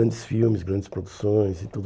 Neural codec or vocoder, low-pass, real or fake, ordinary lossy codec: none; none; real; none